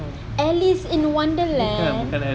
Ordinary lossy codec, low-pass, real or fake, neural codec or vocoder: none; none; real; none